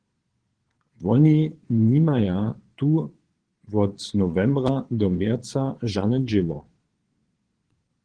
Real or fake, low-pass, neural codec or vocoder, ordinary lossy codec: fake; 9.9 kHz; vocoder, 22.05 kHz, 80 mel bands, Vocos; Opus, 16 kbps